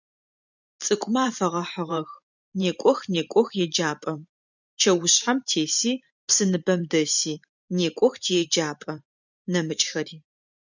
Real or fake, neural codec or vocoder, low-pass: fake; vocoder, 44.1 kHz, 128 mel bands every 512 samples, BigVGAN v2; 7.2 kHz